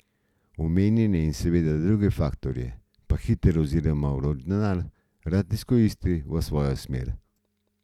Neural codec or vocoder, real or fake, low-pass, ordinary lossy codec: none; real; 19.8 kHz; none